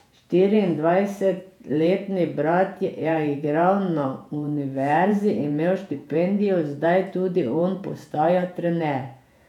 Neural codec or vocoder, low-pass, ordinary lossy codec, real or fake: vocoder, 48 kHz, 128 mel bands, Vocos; 19.8 kHz; none; fake